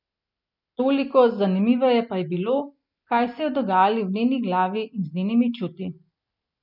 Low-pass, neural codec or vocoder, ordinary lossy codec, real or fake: 5.4 kHz; none; none; real